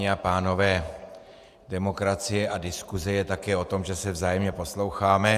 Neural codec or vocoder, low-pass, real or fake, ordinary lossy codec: vocoder, 48 kHz, 128 mel bands, Vocos; 14.4 kHz; fake; Opus, 64 kbps